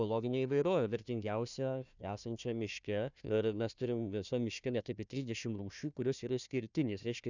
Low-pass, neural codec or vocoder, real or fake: 7.2 kHz; codec, 16 kHz, 1 kbps, FunCodec, trained on Chinese and English, 50 frames a second; fake